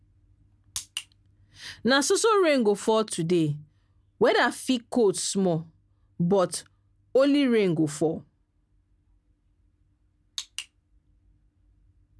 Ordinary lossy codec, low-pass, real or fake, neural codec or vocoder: none; none; real; none